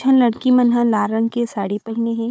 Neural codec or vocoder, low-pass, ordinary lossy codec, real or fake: codec, 16 kHz, 4 kbps, FunCodec, trained on Chinese and English, 50 frames a second; none; none; fake